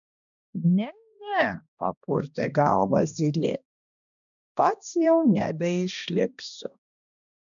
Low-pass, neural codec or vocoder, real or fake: 7.2 kHz; codec, 16 kHz, 1 kbps, X-Codec, HuBERT features, trained on balanced general audio; fake